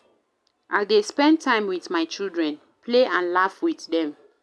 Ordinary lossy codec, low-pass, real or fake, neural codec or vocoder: none; none; real; none